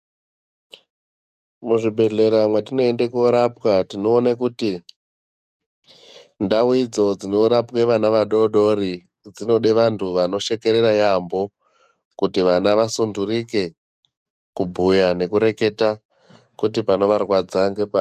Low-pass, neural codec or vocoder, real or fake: 14.4 kHz; codec, 44.1 kHz, 7.8 kbps, DAC; fake